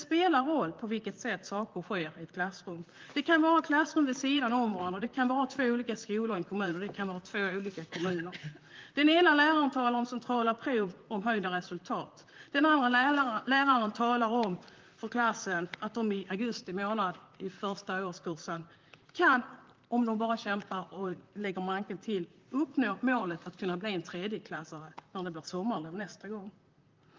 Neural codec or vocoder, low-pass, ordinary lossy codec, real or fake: vocoder, 44.1 kHz, 128 mel bands, Pupu-Vocoder; 7.2 kHz; Opus, 32 kbps; fake